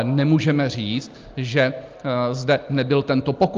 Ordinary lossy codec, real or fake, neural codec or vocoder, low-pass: Opus, 24 kbps; real; none; 7.2 kHz